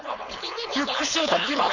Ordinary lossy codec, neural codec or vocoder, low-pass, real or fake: none; codec, 16 kHz, 4.8 kbps, FACodec; 7.2 kHz; fake